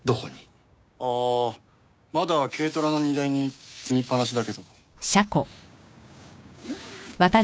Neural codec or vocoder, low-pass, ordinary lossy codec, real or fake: codec, 16 kHz, 6 kbps, DAC; none; none; fake